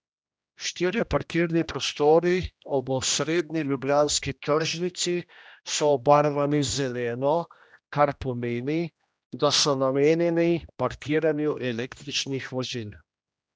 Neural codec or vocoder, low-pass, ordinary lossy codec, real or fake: codec, 16 kHz, 1 kbps, X-Codec, HuBERT features, trained on general audio; none; none; fake